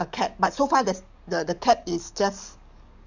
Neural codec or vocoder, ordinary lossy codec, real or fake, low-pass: codec, 44.1 kHz, 7.8 kbps, DAC; none; fake; 7.2 kHz